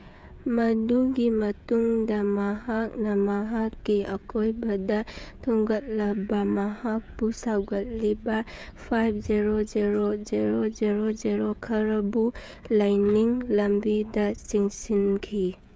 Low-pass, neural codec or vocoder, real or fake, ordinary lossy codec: none; codec, 16 kHz, 16 kbps, FreqCodec, smaller model; fake; none